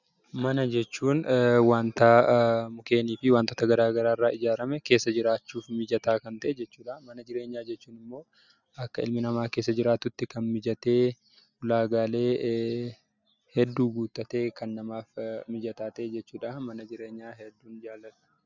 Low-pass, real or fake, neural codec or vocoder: 7.2 kHz; real; none